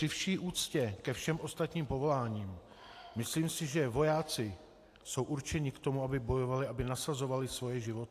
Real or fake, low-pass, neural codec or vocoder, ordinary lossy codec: real; 14.4 kHz; none; AAC, 64 kbps